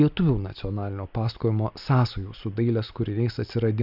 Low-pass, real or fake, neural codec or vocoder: 5.4 kHz; real; none